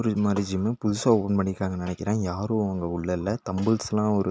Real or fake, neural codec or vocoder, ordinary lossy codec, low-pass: real; none; none; none